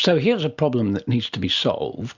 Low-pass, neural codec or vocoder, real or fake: 7.2 kHz; none; real